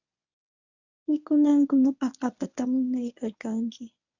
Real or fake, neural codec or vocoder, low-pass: fake; codec, 24 kHz, 0.9 kbps, WavTokenizer, medium speech release version 1; 7.2 kHz